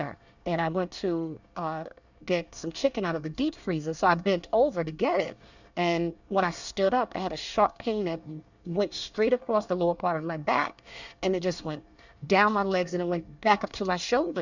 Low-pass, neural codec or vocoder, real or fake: 7.2 kHz; codec, 24 kHz, 1 kbps, SNAC; fake